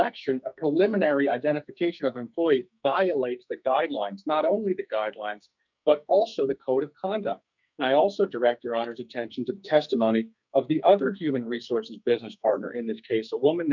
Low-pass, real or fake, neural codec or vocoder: 7.2 kHz; fake; codec, 44.1 kHz, 2.6 kbps, SNAC